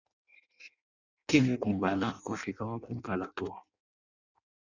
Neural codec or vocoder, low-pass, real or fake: codec, 16 kHz in and 24 kHz out, 0.6 kbps, FireRedTTS-2 codec; 7.2 kHz; fake